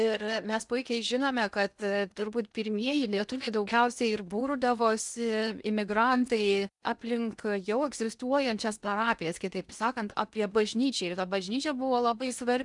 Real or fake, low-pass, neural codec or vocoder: fake; 10.8 kHz; codec, 16 kHz in and 24 kHz out, 0.8 kbps, FocalCodec, streaming, 65536 codes